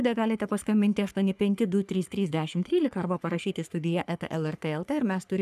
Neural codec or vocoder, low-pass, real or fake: codec, 44.1 kHz, 3.4 kbps, Pupu-Codec; 14.4 kHz; fake